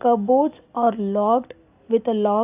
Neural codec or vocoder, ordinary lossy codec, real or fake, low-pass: none; AAC, 32 kbps; real; 3.6 kHz